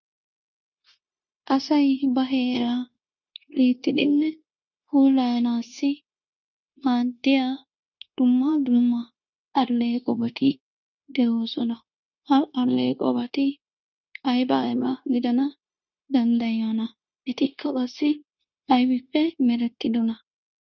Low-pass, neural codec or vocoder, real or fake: 7.2 kHz; codec, 16 kHz, 0.9 kbps, LongCat-Audio-Codec; fake